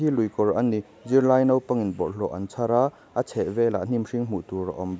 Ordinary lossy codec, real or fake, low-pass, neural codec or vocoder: none; real; none; none